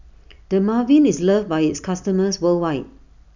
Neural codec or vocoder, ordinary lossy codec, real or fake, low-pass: none; none; real; 7.2 kHz